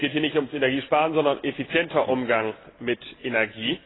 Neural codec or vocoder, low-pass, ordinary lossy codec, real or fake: none; 7.2 kHz; AAC, 16 kbps; real